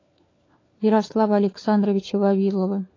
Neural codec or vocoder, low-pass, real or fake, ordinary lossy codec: codec, 16 kHz, 4 kbps, FunCodec, trained on LibriTTS, 50 frames a second; 7.2 kHz; fake; AAC, 32 kbps